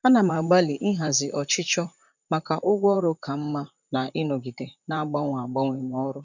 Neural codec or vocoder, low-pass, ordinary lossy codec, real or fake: vocoder, 44.1 kHz, 128 mel bands, Pupu-Vocoder; 7.2 kHz; none; fake